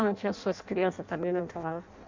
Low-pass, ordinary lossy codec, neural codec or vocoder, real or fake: 7.2 kHz; MP3, 64 kbps; codec, 16 kHz in and 24 kHz out, 0.6 kbps, FireRedTTS-2 codec; fake